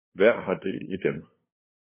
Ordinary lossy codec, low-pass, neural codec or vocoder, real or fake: MP3, 16 kbps; 3.6 kHz; codec, 16 kHz, 2 kbps, FunCodec, trained on LibriTTS, 25 frames a second; fake